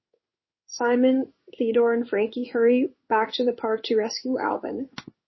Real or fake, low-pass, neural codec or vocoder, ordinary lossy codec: fake; 7.2 kHz; codec, 16 kHz in and 24 kHz out, 1 kbps, XY-Tokenizer; MP3, 24 kbps